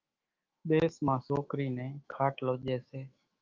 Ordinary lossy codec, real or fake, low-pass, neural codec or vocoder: Opus, 24 kbps; fake; 7.2 kHz; autoencoder, 48 kHz, 128 numbers a frame, DAC-VAE, trained on Japanese speech